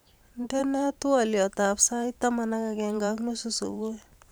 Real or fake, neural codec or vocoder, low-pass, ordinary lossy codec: fake; vocoder, 44.1 kHz, 128 mel bands every 512 samples, BigVGAN v2; none; none